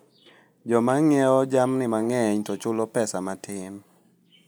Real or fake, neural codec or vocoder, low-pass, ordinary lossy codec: real; none; none; none